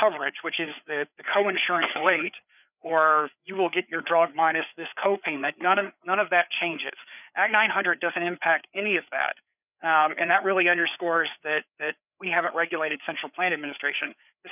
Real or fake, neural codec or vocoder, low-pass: fake; codec, 16 kHz, 4 kbps, FreqCodec, larger model; 3.6 kHz